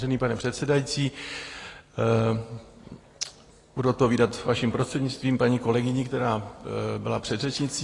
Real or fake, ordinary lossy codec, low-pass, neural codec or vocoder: real; AAC, 32 kbps; 10.8 kHz; none